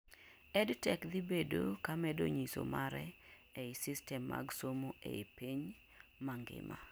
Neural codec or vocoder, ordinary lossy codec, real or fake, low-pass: none; none; real; none